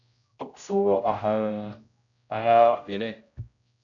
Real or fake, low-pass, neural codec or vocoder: fake; 7.2 kHz; codec, 16 kHz, 0.5 kbps, X-Codec, HuBERT features, trained on general audio